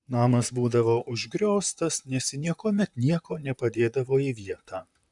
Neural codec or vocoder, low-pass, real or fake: vocoder, 44.1 kHz, 128 mel bands, Pupu-Vocoder; 10.8 kHz; fake